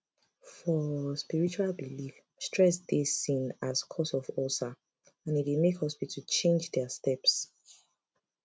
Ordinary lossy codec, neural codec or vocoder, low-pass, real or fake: none; none; none; real